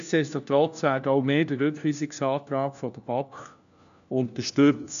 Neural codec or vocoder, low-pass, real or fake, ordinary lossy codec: codec, 16 kHz, 1 kbps, FunCodec, trained on LibriTTS, 50 frames a second; 7.2 kHz; fake; none